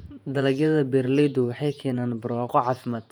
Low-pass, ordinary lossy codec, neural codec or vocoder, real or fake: 19.8 kHz; none; vocoder, 48 kHz, 128 mel bands, Vocos; fake